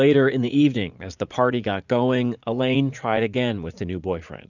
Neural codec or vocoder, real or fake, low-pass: vocoder, 44.1 kHz, 80 mel bands, Vocos; fake; 7.2 kHz